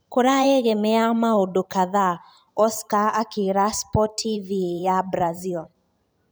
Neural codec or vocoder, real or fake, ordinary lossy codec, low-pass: vocoder, 44.1 kHz, 128 mel bands every 512 samples, BigVGAN v2; fake; none; none